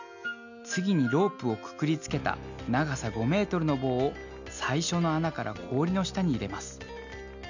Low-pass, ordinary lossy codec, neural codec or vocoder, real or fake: 7.2 kHz; none; none; real